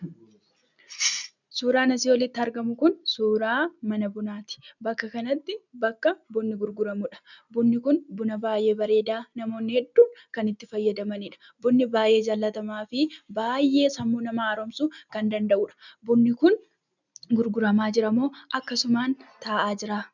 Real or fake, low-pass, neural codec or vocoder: real; 7.2 kHz; none